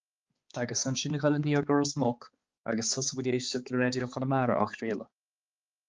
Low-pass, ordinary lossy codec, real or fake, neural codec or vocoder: 7.2 kHz; Opus, 32 kbps; fake; codec, 16 kHz, 4 kbps, X-Codec, HuBERT features, trained on balanced general audio